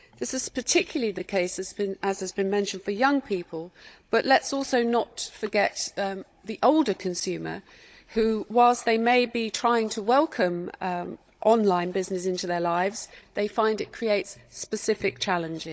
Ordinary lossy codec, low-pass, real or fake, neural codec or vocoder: none; none; fake; codec, 16 kHz, 16 kbps, FunCodec, trained on Chinese and English, 50 frames a second